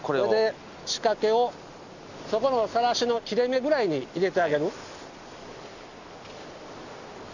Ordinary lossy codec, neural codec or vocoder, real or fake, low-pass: none; none; real; 7.2 kHz